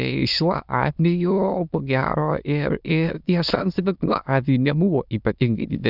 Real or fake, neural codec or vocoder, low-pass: fake; autoencoder, 22.05 kHz, a latent of 192 numbers a frame, VITS, trained on many speakers; 5.4 kHz